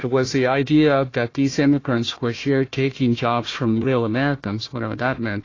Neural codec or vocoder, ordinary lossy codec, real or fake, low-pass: codec, 16 kHz, 1 kbps, FunCodec, trained on Chinese and English, 50 frames a second; AAC, 32 kbps; fake; 7.2 kHz